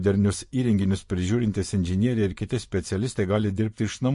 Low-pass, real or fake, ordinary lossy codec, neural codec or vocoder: 10.8 kHz; fake; MP3, 48 kbps; vocoder, 24 kHz, 100 mel bands, Vocos